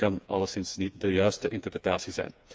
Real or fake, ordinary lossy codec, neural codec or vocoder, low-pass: fake; none; codec, 16 kHz, 4 kbps, FreqCodec, smaller model; none